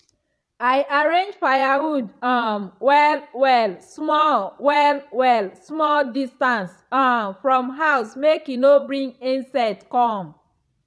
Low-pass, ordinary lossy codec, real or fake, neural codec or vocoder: none; none; fake; vocoder, 22.05 kHz, 80 mel bands, Vocos